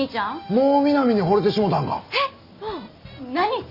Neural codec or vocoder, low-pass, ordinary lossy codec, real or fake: none; 5.4 kHz; none; real